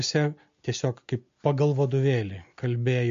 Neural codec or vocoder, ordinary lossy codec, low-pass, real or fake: none; MP3, 48 kbps; 7.2 kHz; real